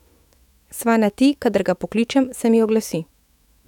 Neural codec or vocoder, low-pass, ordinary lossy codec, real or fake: autoencoder, 48 kHz, 128 numbers a frame, DAC-VAE, trained on Japanese speech; 19.8 kHz; none; fake